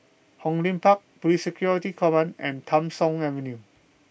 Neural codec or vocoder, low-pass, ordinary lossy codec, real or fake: none; none; none; real